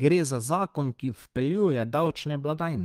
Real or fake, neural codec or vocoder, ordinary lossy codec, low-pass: fake; codec, 32 kHz, 1.9 kbps, SNAC; Opus, 32 kbps; 14.4 kHz